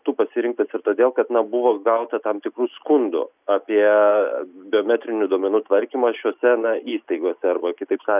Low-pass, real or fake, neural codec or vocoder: 3.6 kHz; real; none